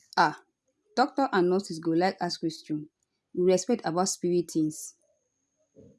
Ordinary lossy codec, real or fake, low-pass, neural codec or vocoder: none; real; none; none